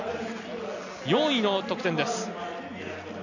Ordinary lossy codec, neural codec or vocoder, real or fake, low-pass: none; none; real; 7.2 kHz